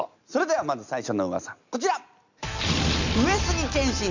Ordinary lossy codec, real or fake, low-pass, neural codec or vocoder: none; real; 7.2 kHz; none